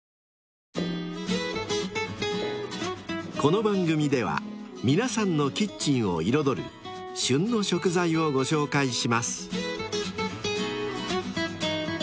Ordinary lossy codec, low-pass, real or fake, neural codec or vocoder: none; none; real; none